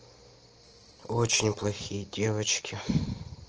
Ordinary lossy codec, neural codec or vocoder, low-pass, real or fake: Opus, 16 kbps; none; 7.2 kHz; real